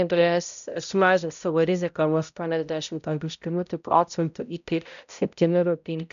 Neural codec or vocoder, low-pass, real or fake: codec, 16 kHz, 0.5 kbps, X-Codec, HuBERT features, trained on balanced general audio; 7.2 kHz; fake